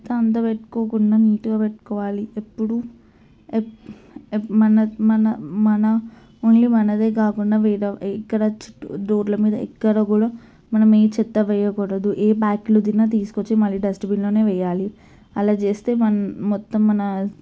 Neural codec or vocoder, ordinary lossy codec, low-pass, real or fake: none; none; none; real